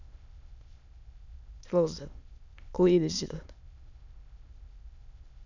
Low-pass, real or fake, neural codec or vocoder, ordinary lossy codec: 7.2 kHz; fake; autoencoder, 22.05 kHz, a latent of 192 numbers a frame, VITS, trained on many speakers; none